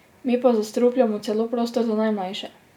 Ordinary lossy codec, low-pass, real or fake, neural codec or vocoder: none; 19.8 kHz; real; none